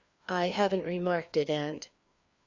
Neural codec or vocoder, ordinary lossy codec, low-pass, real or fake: codec, 16 kHz, 2 kbps, FreqCodec, larger model; Opus, 64 kbps; 7.2 kHz; fake